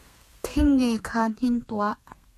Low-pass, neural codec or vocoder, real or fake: 14.4 kHz; codec, 32 kHz, 1.9 kbps, SNAC; fake